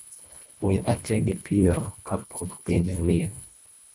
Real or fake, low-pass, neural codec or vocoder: fake; 10.8 kHz; codec, 24 kHz, 1.5 kbps, HILCodec